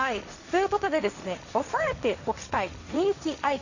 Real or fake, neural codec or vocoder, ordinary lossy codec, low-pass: fake; codec, 16 kHz, 1.1 kbps, Voila-Tokenizer; none; 7.2 kHz